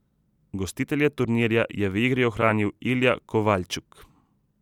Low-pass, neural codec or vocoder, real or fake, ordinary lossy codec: 19.8 kHz; vocoder, 44.1 kHz, 128 mel bands every 256 samples, BigVGAN v2; fake; none